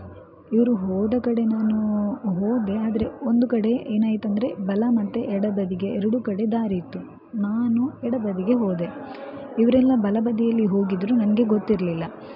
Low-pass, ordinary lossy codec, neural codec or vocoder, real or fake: 5.4 kHz; none; none; real